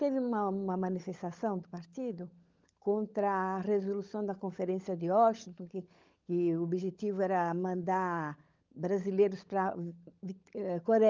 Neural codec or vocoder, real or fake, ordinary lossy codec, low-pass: codec, 16 kHz, 16 kbps, FunCodec, trained on LibriTTS, 50 frames a second; fake; Opus, 32 kbps; 7.2 kHz